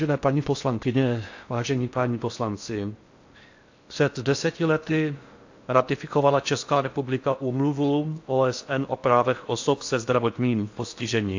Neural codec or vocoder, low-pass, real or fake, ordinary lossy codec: codec, 16 kHz in and 24 kHz out, 0.6 kbps, FocalCodec, streaming, 4096 codes; 7.2 kHz; fake; AAC, 48 kbps